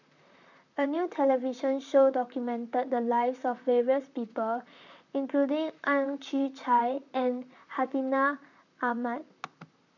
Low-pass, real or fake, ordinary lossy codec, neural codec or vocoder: 7.2 kHz; fake; none; vocoder, 44.1 kHz, 128 mel bands, Pupu-Vocoder